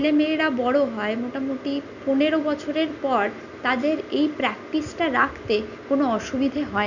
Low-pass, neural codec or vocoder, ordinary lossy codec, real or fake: 7.2 kHz; none; none; real